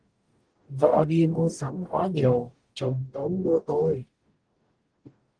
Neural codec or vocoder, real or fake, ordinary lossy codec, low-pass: codec, 44.1 kHz, 0.9 kbps, DAC; fake; Opus, 24 kbps; 9.9 kHz